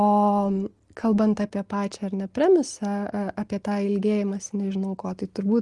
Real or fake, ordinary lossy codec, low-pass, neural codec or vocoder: real; Opus, 24 kbps; 10.8 kHz; none